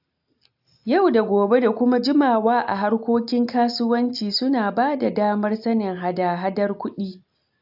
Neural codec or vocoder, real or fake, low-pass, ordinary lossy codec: none; real; 5.4 kHz; AAC, 48 kbps